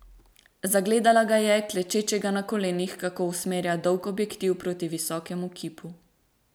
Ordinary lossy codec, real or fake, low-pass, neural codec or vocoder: none; real; none; none